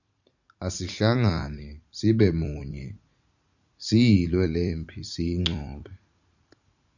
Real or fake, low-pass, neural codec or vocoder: fake; 7.2 kHz; vocoder, 44.1 kHz, 80 mel bands, Vocos